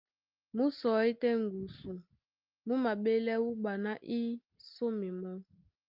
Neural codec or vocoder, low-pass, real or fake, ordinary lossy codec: none; 5.4 kHz; real; Opus, 24 kbps